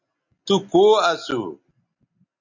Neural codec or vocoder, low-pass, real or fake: none; 7.2 kHz; real